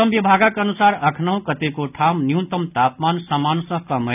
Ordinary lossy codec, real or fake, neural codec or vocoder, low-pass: none; real; none; 3.6 kHz